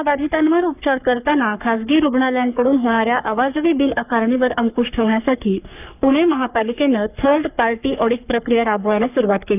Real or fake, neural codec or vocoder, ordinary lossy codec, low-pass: fake; codec, 44.1 kHz, 3.4 kbps, Pupu-Codec; none; 3.6 kHz